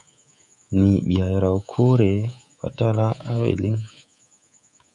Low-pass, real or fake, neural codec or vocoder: 10.8 kHz; fake; codec, 24 kHz, 3.1 kbps, DualCodec